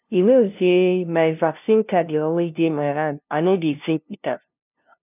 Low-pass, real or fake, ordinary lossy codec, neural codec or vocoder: 3.6 kHz; fake; none; codec, 16 kHz, 0.5 kbps, FunCodec, trained on LibriTTS, 25 frames a second